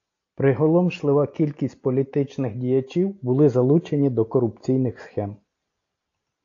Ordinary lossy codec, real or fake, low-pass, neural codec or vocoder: AAC, 48 kbps; real; 7.2 kHz; none